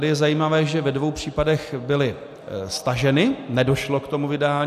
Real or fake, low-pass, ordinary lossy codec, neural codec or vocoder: real; 14.4 kHz; AAC, 96 kbps; none